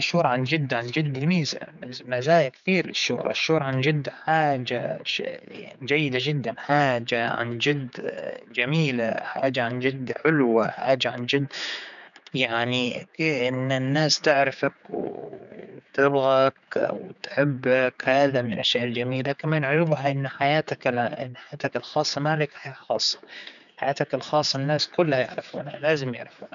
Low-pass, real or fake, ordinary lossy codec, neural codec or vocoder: 7.2 kHz; fake; none; codec, 16 kHz, 4 kbps, X-Codec, HuBERT features, trained on general audio